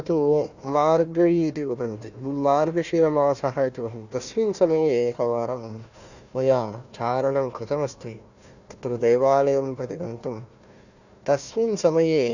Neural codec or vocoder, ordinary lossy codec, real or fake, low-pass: codec, 16 kHz, 1 kbps, FunCodec, trained on Chinese and English, 50 frames a second; none; fake; 7.2 kHz